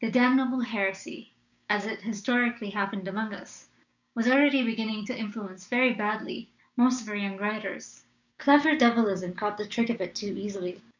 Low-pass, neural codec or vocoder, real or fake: 7.2 kHz; codec, 16 kHz, 6 kbps, DAC; fake